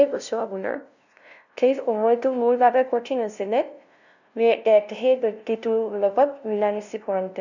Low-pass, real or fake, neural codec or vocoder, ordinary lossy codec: 7.2 kHz; fake; codec, 16 kHz, 0.5 kbps, FunCodec, trained on LibriTTS, 25 frames a second; none